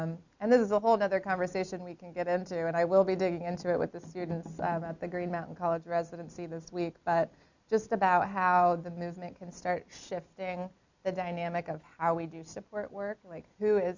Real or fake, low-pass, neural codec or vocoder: real; 7.2 kHz; none